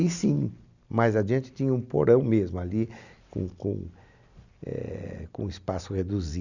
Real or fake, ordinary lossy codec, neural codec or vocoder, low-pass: real; none; none; 7.2 kHz